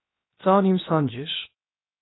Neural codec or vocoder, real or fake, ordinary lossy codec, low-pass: codec, 16 kHz, 0.7 kbps, FocalCodec; fake; AAC, 16 kbps; 7.2 kHz